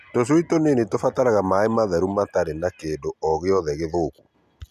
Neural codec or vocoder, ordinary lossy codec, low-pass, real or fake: none; none; 14.4 kHz; real